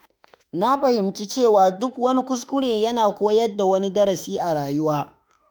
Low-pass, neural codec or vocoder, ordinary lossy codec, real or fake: none; autoencoder, 48 kHz, 32 numbers a frame, DAC-VAE, trained on Japanese speech; none; fake